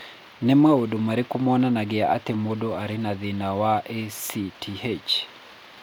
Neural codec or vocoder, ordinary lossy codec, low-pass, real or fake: none; none; none; real